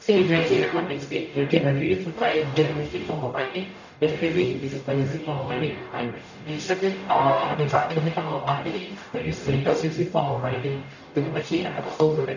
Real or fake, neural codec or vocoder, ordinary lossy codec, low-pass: fake; codec, 44.1 kHz, 0.9 kbps, DAC; none; 7.2 kHz